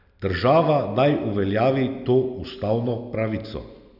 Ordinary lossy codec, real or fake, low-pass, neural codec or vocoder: none; real; 5.4 kHz; none